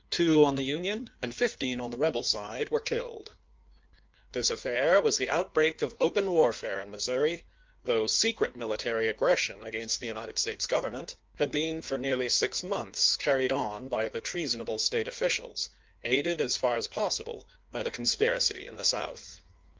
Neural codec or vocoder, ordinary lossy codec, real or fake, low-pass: codec, 16 kHz in and 24 kHz out, 1.1 kbps, FireRedTTS-2 codec; Opus, 24 kbps; fake; 7.2 kHz